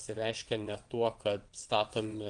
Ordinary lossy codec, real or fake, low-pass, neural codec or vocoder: Opus, 24 kbps; fake; 9.9 kHz; vocoder, 22.05 kHz, 80 mel bands, WaveNeXt